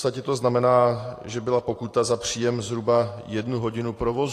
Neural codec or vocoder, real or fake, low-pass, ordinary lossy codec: vocoder, 44.1 kHz, 128 mel bands every 512 samples, BigVGAN v2; fake; 14.4 kHz; AAC, 48 kbps